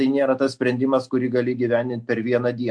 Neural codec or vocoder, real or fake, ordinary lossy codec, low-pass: none; real; MP3, 64 kbps; 9.9 kHz